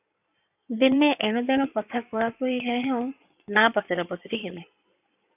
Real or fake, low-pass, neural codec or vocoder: fake; 3.6 kHz; codec, 16 kHz in and 24 kHz out, 2.2 kbps, FireRedTTS-2 codec